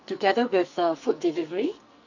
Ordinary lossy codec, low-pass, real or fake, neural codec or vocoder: none; 7.2 kHz; fake; codec, 44.1 kHz, 2.6 kbps, SNAC